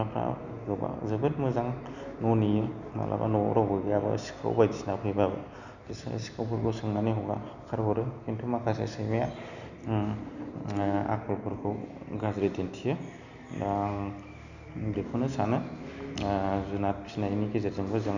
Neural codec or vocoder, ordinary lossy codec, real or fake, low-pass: none; none; real; 7.2 kHz